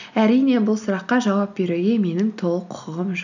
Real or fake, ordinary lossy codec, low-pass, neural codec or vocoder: real; none; 7.2 kHz; none